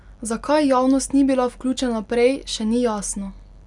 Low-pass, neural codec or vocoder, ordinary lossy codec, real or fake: 10.8 kHz; none; none; real